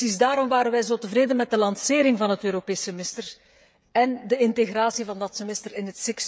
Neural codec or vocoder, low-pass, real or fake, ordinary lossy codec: codec, 16 kHz, 16 kbps, FreqCodec, smaller model; none; fake; none